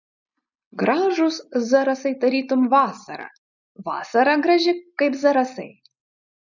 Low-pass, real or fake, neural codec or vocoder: 7.2 kHz; real; none